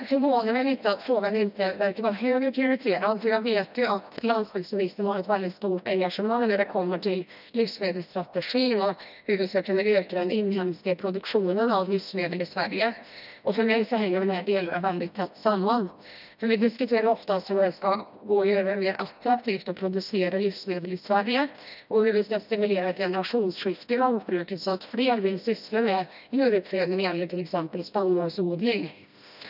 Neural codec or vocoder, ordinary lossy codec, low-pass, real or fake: codec, 16 kHz, 1 kbps, FreqCodec, smaller model; none; 5.4 kHz; fake